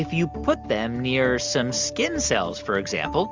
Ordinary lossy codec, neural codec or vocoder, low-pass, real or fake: Opus, 32 kbps; none; 7.2 kHz; real